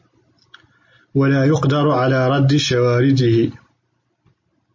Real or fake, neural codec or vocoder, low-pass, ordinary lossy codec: real; none; 7.2 kHz; MP3, 32 kbps